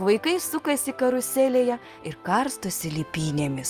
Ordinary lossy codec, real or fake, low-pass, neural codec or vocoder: Opus, 32 kbps; real; 14.4 kHz; none